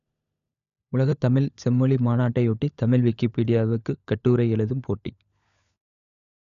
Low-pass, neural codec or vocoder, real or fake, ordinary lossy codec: 7.2 kHz; codec, 16 kHz, 16 kbps, FunCodec, trained on LibriTTS, 50 frames a second; fake; none